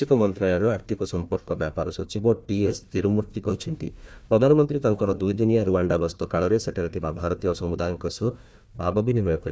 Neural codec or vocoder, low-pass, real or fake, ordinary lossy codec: codec, 16 kHz, 1 kbps, FunCodec, trained on Chinese and English, 50 frames a second; none; fake; none